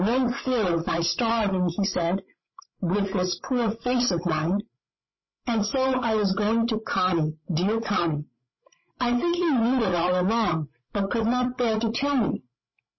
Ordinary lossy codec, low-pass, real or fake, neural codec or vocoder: MP3, 24 kbps; 7.2 kHz; fake; codec, 16 kHz, 16 kbps, FreqCodec, larger model